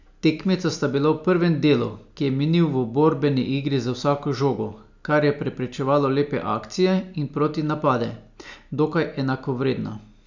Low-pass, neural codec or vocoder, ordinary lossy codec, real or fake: 7.2 kHz; none; none; real